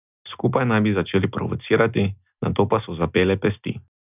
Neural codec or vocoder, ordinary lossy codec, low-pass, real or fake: none; none; 3.6 kHz; real